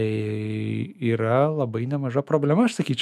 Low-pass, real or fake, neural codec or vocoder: 14.4 kHz; fake; autoencoder, 48 kHz, 128 numbers a frame, DAC-VAE, trained on Japanese speech